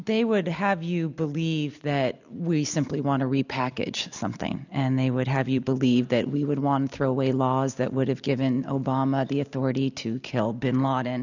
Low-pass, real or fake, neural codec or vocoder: 7.2 kHz; real; none